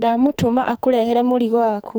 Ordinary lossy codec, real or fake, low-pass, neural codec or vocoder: none; fake; none; codec, 44.1 kHz, 2.6 kbps, SNAC